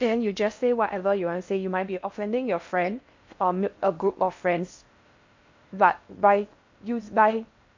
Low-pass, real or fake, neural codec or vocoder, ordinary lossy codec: 7.2 kHz; fake; codec, 16 kHz in and 24 kHz out, 0.6 kbps, FocalCodec, streaming, 4096 codes; MP3, 48 kbps